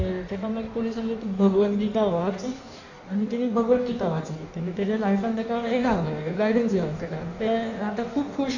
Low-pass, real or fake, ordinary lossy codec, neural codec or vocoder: 7.2 kHz; fake; none; codec, 16 kHz in and 24 kHz out, 1.1 kbps, FireRedTTS-2 codec